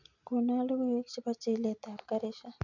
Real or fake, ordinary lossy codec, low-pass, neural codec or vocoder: real; none; 7.2 kHz; none